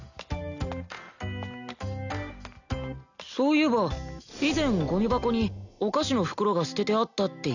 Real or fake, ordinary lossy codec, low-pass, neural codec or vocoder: real; none; 7.2 kHz; none